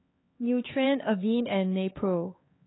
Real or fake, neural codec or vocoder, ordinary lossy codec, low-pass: fake; codec, 16 kHz, 4 kbps, X-Codec, HuBERT features, trained on LibriSpeech; AAC, 16 kbps; 7.2 kHz